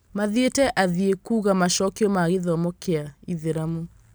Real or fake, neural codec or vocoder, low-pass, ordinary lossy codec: real; none; none; none